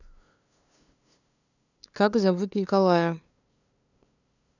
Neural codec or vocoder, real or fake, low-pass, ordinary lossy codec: codec, 16 kHz, 2 kbps, FunCodec, trained on LibriTTS, 25 frames a second; fake; 7.2 kHz; none